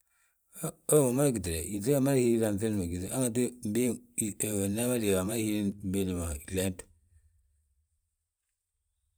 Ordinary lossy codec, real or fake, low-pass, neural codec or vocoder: none; fake; none; vocoder, 44.1 kHz, 128 mel bands every 512 samples, BigVGAN v2